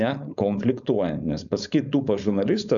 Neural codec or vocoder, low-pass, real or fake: codec, 16 kHz, 4.8 kbps, FACodec; 7.2 kHz; fake